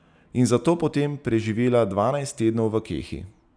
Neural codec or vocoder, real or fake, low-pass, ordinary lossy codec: none; real; 9.9 kHz; none